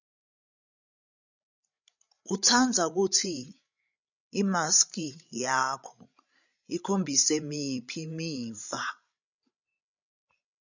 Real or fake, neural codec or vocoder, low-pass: fake; codec, 16 kHz, 16 kbps, FreqCodec, larger model; 7.2 kHz